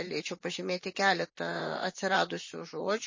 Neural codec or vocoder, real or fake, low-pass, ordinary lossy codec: vocoder, 44.1 kHz, 128 mel bands, Pupu-Vocoder; fake; 7.2 kHz; MP3, 32 kbps